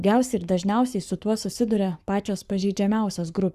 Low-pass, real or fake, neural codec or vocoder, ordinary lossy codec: 14.4 kHz; fake; codec, 44.1 kHz, 7.8 kbps, Pupu-Codec; Opus, 64 kbps